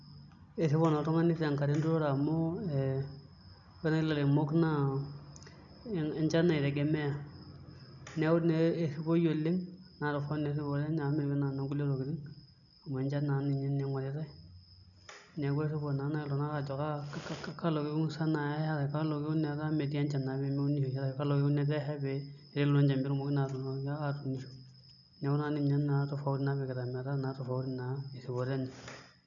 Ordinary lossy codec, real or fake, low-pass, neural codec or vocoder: none; real; 7.2 kHz; none